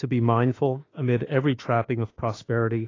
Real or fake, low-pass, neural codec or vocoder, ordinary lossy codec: fake; 7.2 kHz; autoencoder, 48 kHz, 32 numbers a frame, DAC-VAE, trained on Japanese speech; AAC, 32 kbps